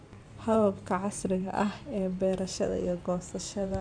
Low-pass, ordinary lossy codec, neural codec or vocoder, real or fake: 9.9 kHz; none; vocoder, 44.1 kHz, 128 mel bands every 512 samples, BigVGAN v2; fake